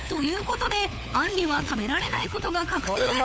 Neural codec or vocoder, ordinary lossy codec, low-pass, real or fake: codec, 16 kHz, 4 kbps, FunCodec, trained on LibriTTS, 50 frames a second; none; none; fake